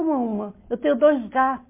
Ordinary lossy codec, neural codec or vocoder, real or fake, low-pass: MP3, 24 kbps; none; real; 3.6 kHz